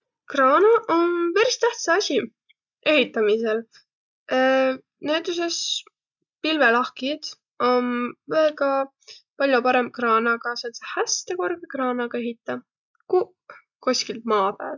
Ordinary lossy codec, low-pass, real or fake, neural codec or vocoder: none; 7.2 kHz; real; none